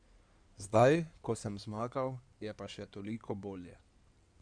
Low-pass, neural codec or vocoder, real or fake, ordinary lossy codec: 9.9 kHz; codec, 16 kHz in and 24 kHz out, 2.2 kbps, FireRedTTS-2 codec; fake; none